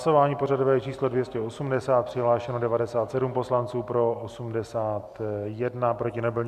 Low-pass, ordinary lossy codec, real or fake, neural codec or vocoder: 14.4 kHz; MP3, 96 kbps; real; none